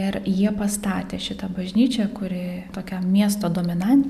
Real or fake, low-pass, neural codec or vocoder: real; 14.4 kHz; none